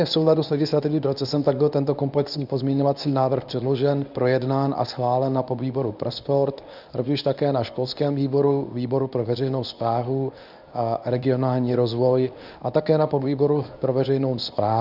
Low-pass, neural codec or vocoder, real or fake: 5.4 kHz; codec, 24 kHz, 0.9 kbps, WavTokenizer, medium speech release version 1; fake